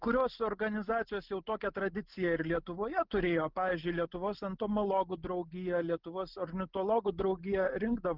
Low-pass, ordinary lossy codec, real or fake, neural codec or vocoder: 5.4 kHz; Opus, 32 kbps; real; none